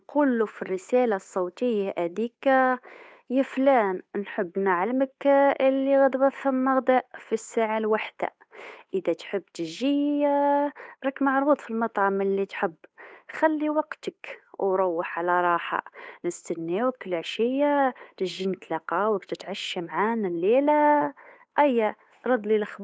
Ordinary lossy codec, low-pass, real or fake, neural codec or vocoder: none; none; fake; codec, 16 kHz, 8 kbps, FunCodec, trained on Chinese and English, 25 frames a second